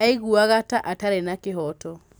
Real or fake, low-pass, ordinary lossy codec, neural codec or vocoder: real; none; none; none